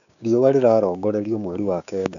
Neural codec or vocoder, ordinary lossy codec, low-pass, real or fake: codec, 16 kHz, 6 kbps, DAC; none; 7.2 kHz; fake